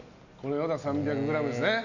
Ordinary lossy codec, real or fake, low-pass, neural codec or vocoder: none; real; 7.2 kHz; none